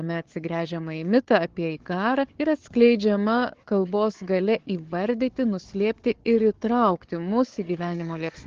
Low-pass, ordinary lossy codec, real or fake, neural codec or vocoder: 7.2 kHz; Opus, 16 kbps; fake; codec, 16 kHz, 4 kbps, FunCodec, trained on Chinese and English, 50 frames a second